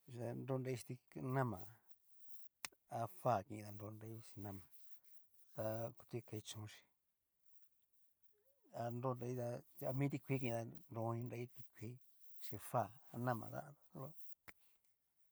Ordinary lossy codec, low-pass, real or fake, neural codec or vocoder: none; none; real; none